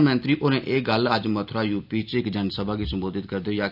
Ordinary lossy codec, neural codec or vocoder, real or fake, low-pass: none; none; real; 5.4 kHz